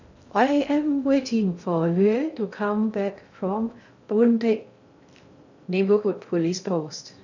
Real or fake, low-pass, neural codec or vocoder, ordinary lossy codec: fake; 7.2 kHz; codec, 16 kHz in and 24 kHz out, 0.6 kbps, FocalCodec, streaming, 2048 codes; none